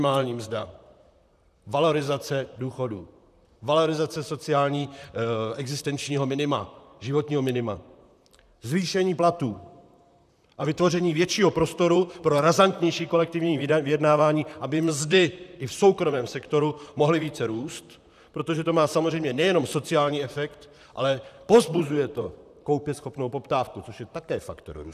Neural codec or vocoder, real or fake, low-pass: vocoder, 44.1 kHz, 128 mel bands, Pupu-Vocoder; fake; 14.4 kHz